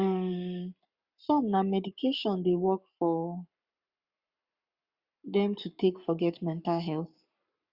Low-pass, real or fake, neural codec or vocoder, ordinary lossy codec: 5.4 kHz; fake; codec, 44.1 kHz, 7.8 kbps, Pupu-Codec; Opus, 64 kbps